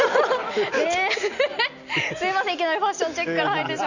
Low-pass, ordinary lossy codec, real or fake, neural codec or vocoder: 7.2 kHz; none; real; none